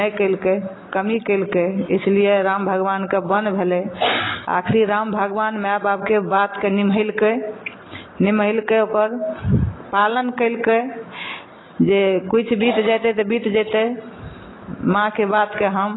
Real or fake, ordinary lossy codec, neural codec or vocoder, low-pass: real; AAC, 16 kbps; none; 7.2 kHz